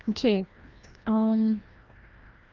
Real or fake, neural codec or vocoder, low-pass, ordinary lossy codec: fake; codec, 16 kHz, 1 kbps, FreqCodec, larger model; 7.2 kHz; Opus, 24 kbps